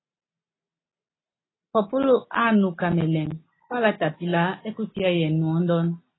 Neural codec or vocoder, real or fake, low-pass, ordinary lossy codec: none; real; 7.2 kHz; AAC, 16 kbps